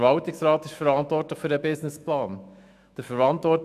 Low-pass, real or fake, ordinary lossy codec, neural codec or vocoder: 14.4 kHz; fake; none; autoencoder, 48 kHz, 128 numbers a frame, DAC-VAE, trained on Japanese speech